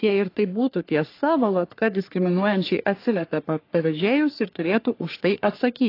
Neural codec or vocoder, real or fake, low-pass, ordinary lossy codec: codec, 44.1 kHz, 3.4 kbps, Pupu-Codec; fake; 5.4 kHz; AAC, 32 kbps